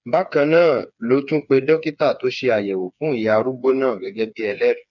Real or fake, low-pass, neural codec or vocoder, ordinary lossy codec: fake; 7.2 kHz; codec, 16 kHz, 4 kbps, FreqCodec, smaller model; none